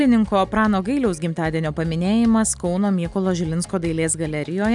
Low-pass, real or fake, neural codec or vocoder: 10.8 kHz; real; none